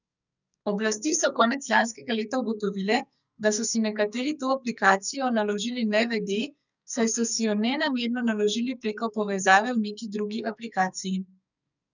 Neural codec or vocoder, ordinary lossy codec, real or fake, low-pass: codec, 44.1 kHz, 2.6 kbps, SNAC; none; fake; 7.2 kHz